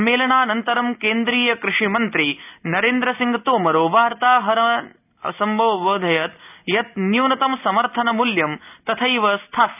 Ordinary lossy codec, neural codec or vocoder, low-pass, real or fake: none; none; 3.6 kHz; real